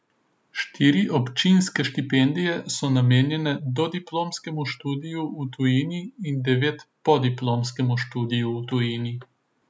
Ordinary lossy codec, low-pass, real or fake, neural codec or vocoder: none; none; real; none